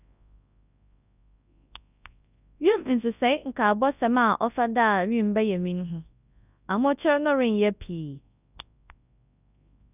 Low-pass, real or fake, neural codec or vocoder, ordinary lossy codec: 3.6 kHz; fake; codec, 24 kHz, 0.9 kbps, WavTokenizer, large speech release; none